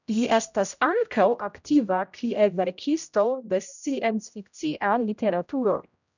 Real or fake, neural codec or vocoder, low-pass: fake; codec, 16 kHz, 0.5 kbps, X-Codec, HuBERT features, trained on general audio; 7.2 kHz